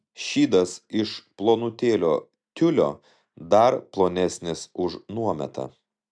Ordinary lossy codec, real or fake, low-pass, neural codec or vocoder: AAC, 64 kbps; real; 9.9 kHz; none